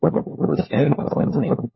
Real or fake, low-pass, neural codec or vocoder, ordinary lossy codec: fake; 7.2 kHz; codec, 16 kHz, 1 kbps, FunCodec, trained on LibriTTS, 50 frames a second; MP3, 24 kbps